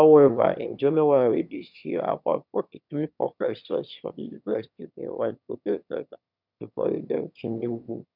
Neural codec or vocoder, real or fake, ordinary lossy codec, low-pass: autoencoder, 22.05 kHz, a latent of 192 numbers a frame, VITS, trained on one speaker; fake; none; 5.4 kHz